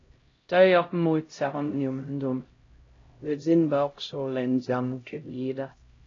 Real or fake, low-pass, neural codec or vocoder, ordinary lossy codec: fake; 7.2 kHz; codec, 16 kHz, 0.5 kbps, X-Codec, HuBERT features, trained on LibriSpeech; AAC, 32 kbps